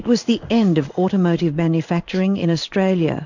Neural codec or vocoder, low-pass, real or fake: codec, 16 kHz in and 24 kHz out, 1 kbps, XY-Tokenizer; 7.2 kHz; fake